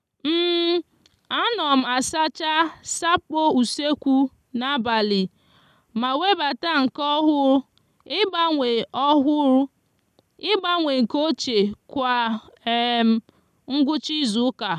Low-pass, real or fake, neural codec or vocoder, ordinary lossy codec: 14.4 kHz; real; none; none